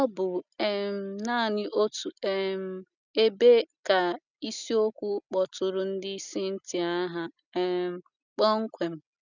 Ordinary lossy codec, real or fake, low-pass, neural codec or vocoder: none; real; 7.2 kHz; none